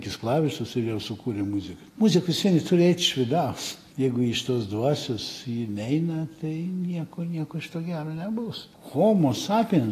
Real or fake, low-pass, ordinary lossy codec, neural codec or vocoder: real; 14.4 kHz; AAC, 48 kbps; none